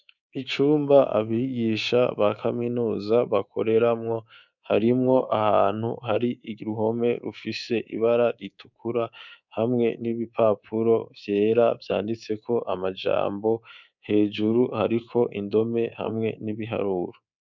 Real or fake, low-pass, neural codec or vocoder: fake; 7.2 kHz; codec, 24 kHz, 3.1 kbps, DualCodec